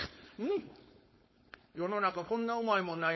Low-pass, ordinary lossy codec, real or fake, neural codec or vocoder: 7.2 kHz; MP3, 24 kbps; fake; codec, 16 kHz, 4.8 kbps, FACodec